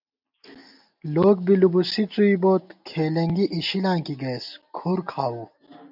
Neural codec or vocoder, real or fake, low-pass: none; real; 5.4 kHz